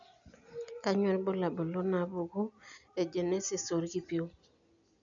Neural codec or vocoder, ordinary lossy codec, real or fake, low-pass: none; none; real; 7.2 kHz